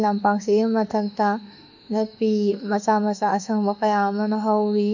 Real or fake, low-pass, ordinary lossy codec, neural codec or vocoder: fake; 7.2 kHz; none; autoencoder, 48 kHz, 32 numbers a frame, DAC-VAE, trained on Japanese speech